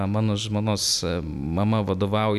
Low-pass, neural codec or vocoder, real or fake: 14.4 kHz; none; real